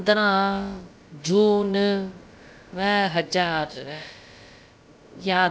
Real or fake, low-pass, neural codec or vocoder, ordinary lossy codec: fake; none; codec, 16 kHz, about 1 kbps, DyCAST, with the encoder's durations; none